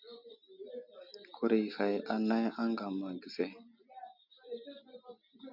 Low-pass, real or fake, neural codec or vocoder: 5.4 kHz; real; none